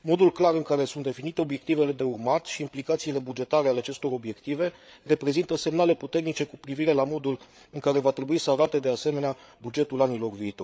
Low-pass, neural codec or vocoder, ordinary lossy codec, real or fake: none; codec, 16 kHz, 8 kbps, FreqCodec, larger model; none; fake